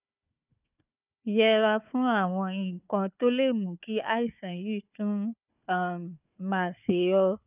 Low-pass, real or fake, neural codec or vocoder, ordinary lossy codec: 3.6 kHz; fake; codec, 16 kHz, 4 kbps, FunCodec, trained on Chinese and English, 50 frames a second; none